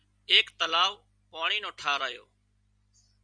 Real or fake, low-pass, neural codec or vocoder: real; 9.9 kHz; none